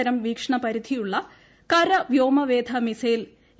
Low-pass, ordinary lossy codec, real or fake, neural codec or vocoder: none; none; real; none